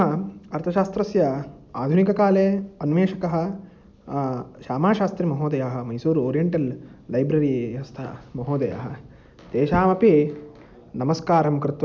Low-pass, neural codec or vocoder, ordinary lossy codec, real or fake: none; none; none; real